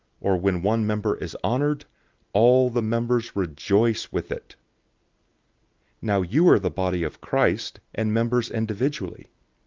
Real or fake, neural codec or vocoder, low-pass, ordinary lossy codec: real; none; 7.2 kHz; Opus, 24 kbps